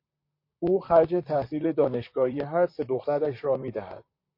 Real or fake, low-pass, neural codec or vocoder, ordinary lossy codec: fake; 5.4 kHz; vocoder, 44.1 kHz, 128 mel bands, Pupu-Vocoder; MP3, 32 kbps